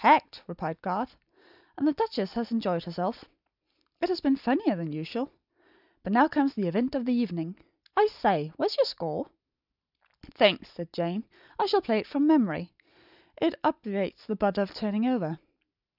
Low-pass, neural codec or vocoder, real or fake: 5.4 kHz; none; real